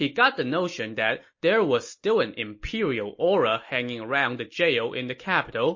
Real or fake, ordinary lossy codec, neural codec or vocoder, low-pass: real; MP3, 32 kbps; none; 7.2 kHz